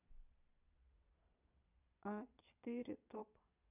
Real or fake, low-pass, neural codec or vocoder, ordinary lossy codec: fake; 3.6 kHz; vocoder, 22.05 kHz, 80 mel bands, Vocos; none